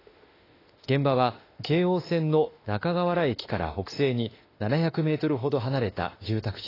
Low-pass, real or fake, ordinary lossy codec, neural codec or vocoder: 5.4 kHz; fake; AAC, 24 kbps; autoencoder, 48 kHz, 32 numbers a frame, DAC-VAE, trained on Japanese speech